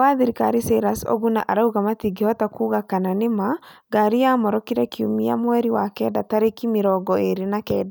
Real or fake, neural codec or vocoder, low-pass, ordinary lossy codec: real; none; none; none